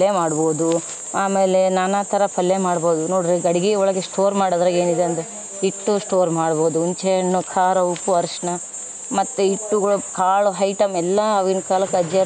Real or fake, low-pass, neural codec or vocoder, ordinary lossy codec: real; none; none; none